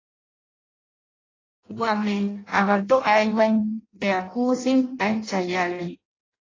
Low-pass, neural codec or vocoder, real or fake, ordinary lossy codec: 7.2 kHz; codec, 16 kHz in and 24 kHz out, 0.6 kbps, FireRedTTS-2 codec; fake; AAC, 32 kbps